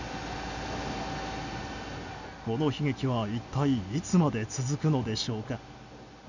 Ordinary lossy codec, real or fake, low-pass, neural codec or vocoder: none; real; 7.2 kHz; none